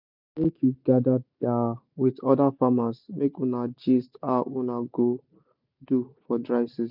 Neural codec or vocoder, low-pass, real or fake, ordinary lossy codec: none; 5.4 kHz; real; none